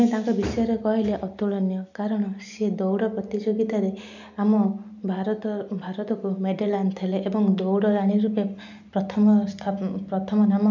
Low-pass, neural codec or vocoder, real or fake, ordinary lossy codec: 7.2 kHz; none; real; none